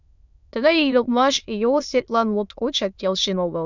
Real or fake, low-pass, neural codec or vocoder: fake; 7.2 kHz; autoencoder, 22.05 kHz, a latent of 192 numbers a frame, VITS, trained on many speakers